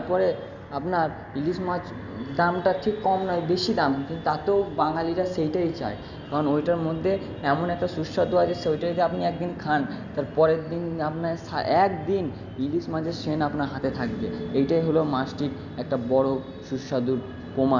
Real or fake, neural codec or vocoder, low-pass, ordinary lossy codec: real; none; 7.2 kHz; none